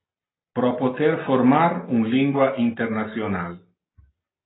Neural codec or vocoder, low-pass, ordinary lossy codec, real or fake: none; 7.2 kHz; AAC, 16 kbps; real